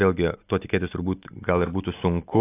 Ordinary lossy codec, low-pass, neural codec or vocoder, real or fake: AAC, 24 kbps; 3.6 kHz; none; real